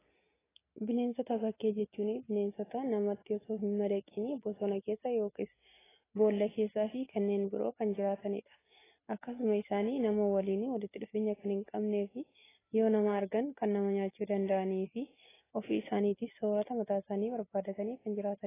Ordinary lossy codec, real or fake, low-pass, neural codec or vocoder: AAC, 16 kbps; real; 3.6 kHz; none